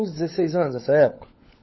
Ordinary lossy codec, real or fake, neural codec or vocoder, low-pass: MP3, 24 kbps; fake; codec, 16 kHz, 16 kbps, FunCodec, trained on LibriTTS, 50 frames a second; 7.2 kHz